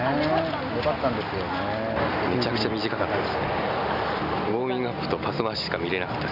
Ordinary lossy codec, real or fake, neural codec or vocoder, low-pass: none; real; none; 5.4 kHz